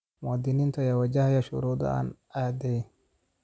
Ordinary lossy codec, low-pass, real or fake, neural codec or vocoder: none; none; real; none